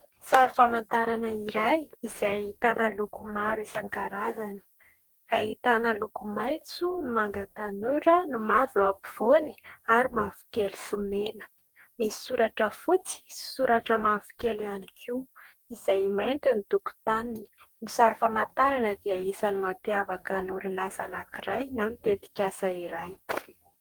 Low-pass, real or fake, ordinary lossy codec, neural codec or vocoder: 19.8 kHz; fake; Opus, 32 kbps; codec, 44.1 kHz, 2.6 kbps, DAC